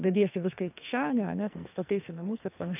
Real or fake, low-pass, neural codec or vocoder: fake; 3.6 kHz; codec, 44.1 kHz, 2.6 kbps, SNAC